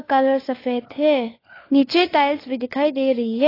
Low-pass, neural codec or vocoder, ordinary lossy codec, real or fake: 5.4 kHz; codec, 16 kHz, 4 kbps, FunCodec, trained on LibriTTS, 50 frames a second; AAC, 24 kbps; fake